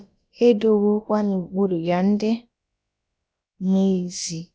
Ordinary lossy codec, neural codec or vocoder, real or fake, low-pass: none; codec, 16 kHz, about 1 kbps, DyCAST, with the encoder's durations; fake; none